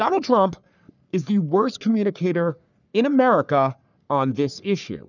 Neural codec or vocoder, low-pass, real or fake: codec, 44.1 kHz, 3.4 kbps, Pupu-Codec; 7.2 kHz; fake